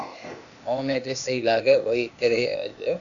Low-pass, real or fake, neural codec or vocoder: 7.2 kHz; fake; codec, 16 kHz, 0.8 kbps, ZipCodec